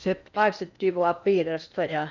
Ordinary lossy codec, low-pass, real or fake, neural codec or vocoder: none; 7.2 kHz; fake; codec, 16 kHz in and 24 kHz out, 0.8 kbps, FocalCodec, streaming, 65536 codes